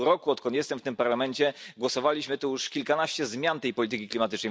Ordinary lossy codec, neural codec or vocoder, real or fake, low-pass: none; none; real; none